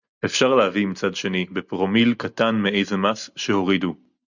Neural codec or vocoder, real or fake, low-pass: none; real; 7.2 kHz